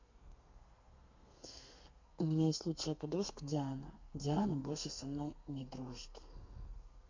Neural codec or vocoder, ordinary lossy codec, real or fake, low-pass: codec, 32 kHz, 1.9 kbps, SNAC; MP3, 48 kbps; fake; 7.2 kHz